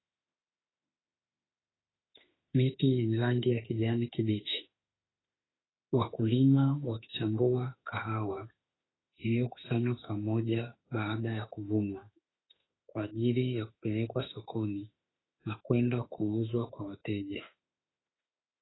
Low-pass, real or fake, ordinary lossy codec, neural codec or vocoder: 7.2 kHz; fake; AAC, 16 kbps; autoencoder, 48 kHz, 32 numbers a frame, DAC-VAE, trained on Japanese speech